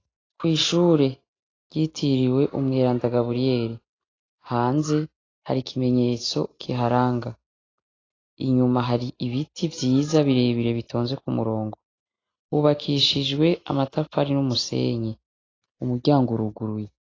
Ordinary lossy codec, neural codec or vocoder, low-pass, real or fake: AAC, 32 kbps; none; 7.2 kHz; real